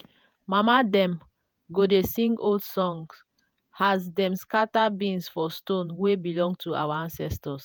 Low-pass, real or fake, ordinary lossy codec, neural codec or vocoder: none; fake; none; vocoder, 48 kHz, 128 mel bands, Vocos